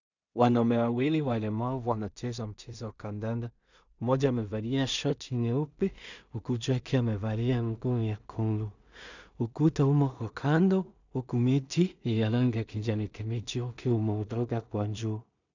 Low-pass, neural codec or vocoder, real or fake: 7.2 kHz; codec, 16 kHz in and 24 kHz out, 0.4 kbps, LongCat-Audio-Codec, two codebook decoder; fake